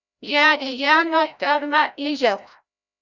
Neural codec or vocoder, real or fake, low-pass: codec, 16 kHz, 0.5 kbps, FreqCodec, larger model; fake; 7.2 kHz